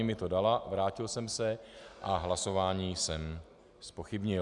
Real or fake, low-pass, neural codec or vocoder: real; 10.8 kHz; none